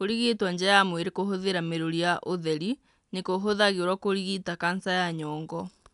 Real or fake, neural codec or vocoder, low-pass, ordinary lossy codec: real; none; 10.8 kHz; none